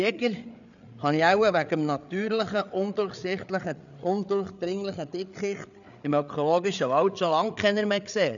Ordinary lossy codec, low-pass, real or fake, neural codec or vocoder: none; 7.2 kHz; fake; codec, 16 kHz, 8 kbps, FreqCodec, larger model